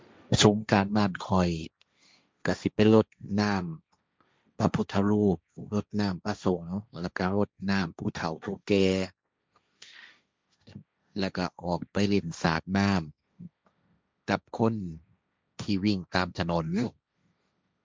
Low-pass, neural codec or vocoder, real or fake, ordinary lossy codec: none; codec, 16 kHz, 1.1 kbps, Voila-Tokenizer; fake; none